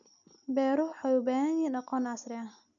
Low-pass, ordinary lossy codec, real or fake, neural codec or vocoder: 7.2 kHz; none; real; none